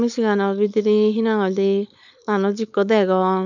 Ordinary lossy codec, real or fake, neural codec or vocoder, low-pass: none; fake; codec, 16 kHz, 4.8 kbps, FACodec; 7.2 kHz